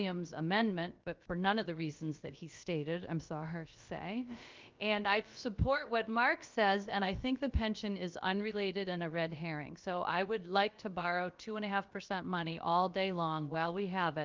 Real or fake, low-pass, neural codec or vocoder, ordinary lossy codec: fake; 7.2 kHz; codec, 16 kHz, about 1 kbps, DyCAST, with the encoder's durations; Opus, 24 kbps